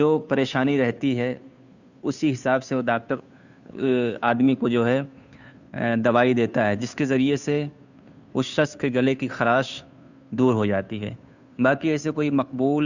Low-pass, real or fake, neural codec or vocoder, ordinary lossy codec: 7.2 kHz; fake; codec, 16 kHz, 2 kbps, FunCodec, trained on Chinese and English, 25 frames a second; none